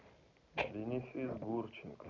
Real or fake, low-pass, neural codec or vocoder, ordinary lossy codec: real; 7.2 kHz; none; none